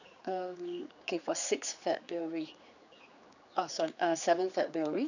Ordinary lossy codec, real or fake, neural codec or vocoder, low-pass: none; fake; codec, 16 kHz, 4 kbps, X-Codec, HuBERT features, trained on general audio; 7.2 kHz